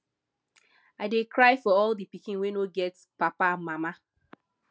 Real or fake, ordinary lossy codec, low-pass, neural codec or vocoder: real; none; none; none